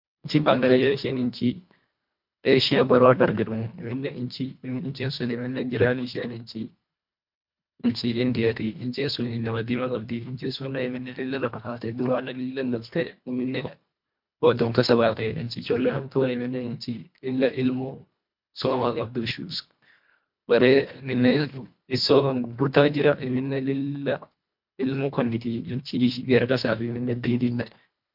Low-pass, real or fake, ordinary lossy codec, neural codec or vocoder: 5.4 kHz; fake; MP3, 48 kbps; codec, 24 kHz, 1.5 kbps, HILCodec